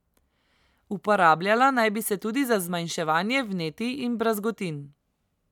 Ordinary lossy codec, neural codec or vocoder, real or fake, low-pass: none; none; real; 19.8 kHz